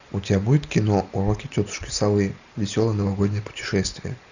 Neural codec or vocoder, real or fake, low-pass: none; real; 7.2 kHz